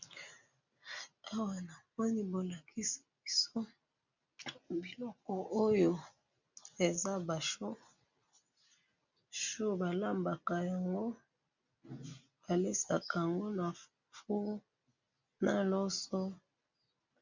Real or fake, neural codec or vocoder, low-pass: real; none; 7.2 kHz